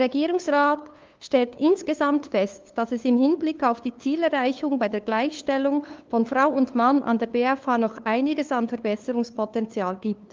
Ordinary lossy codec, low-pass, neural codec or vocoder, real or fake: Opus, 24 kbps; 7.2 kHz; codec, 16 kHz, 2 kbps, FunCodec, trained on LibriTTS, 25 frames a second; fake